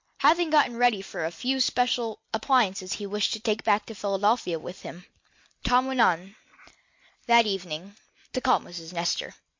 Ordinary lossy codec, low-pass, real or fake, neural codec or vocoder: MP3, 48 kbps; 7.2 kHz; real; none